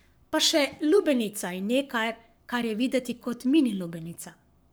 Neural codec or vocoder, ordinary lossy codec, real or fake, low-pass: codec, 44.1 kHz, 7.8 kbps, Pupu-Codec; none; fake; none